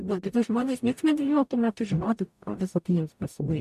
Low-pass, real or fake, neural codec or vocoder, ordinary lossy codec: 14.4 kHz; fake; codec, 44.1 kHz, 0.9 kbps, DAC; MP3, 96 kbps